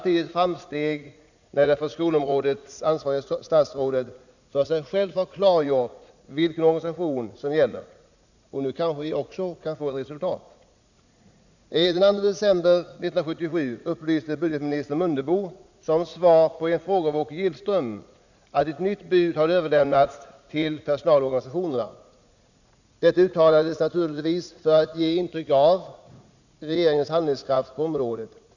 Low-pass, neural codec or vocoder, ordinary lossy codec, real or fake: 7.2 kHz; vocoder, 44.1 kHz, 80 mel bands, Vocos; none; fake